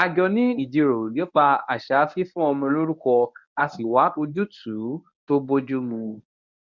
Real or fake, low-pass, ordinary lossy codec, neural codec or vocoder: fake; 7.2 kHz; none; codec, 24 kHz, 0.9 kbps, WavTokenizer, medium speech release version 1